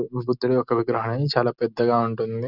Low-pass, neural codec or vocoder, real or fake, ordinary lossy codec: 5.4 kHz; none; real; none